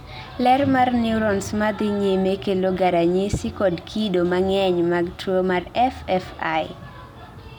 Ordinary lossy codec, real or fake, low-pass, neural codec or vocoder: none; real; 19.8 kHz; none